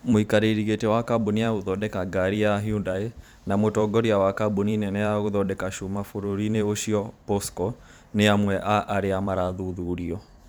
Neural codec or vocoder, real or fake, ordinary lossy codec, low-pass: vocoder, 44.1 kHz, 128 mel bands every 512 samples, BigVGAN v2; fake; none; none